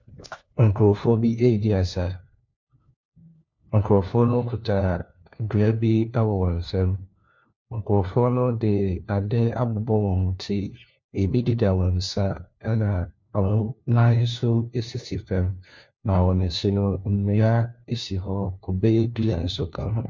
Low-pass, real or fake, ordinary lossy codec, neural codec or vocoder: 7.2 kHz; fake; MP3, 48 kbps; codec, 16 kHz, 1 kbps, FunCodec, trained on LibriTTS, 50 frames a second